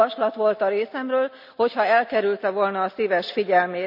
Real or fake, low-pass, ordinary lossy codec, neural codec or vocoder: real; 5.4 kHz; none; none